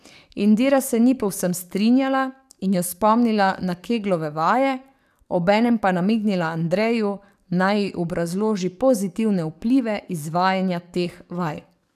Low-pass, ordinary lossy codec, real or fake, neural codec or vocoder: 14.4 kHz; none; fake; codec, 44.1 kHz, 7.8 kbps, DAC